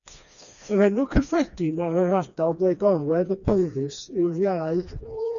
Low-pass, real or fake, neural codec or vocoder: 7.2 kHz; fake; codec, 16 kHz, 2 kbps, FreqCodec, smaller model